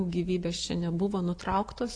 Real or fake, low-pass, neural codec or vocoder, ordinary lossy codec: real; 9.9 kHz; none; AAC, 48 kbps